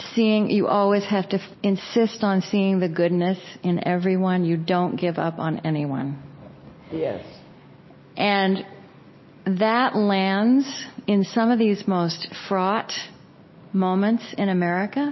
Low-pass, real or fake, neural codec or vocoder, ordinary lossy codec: 7.2 kHz; real; none; MP3, 24 kbps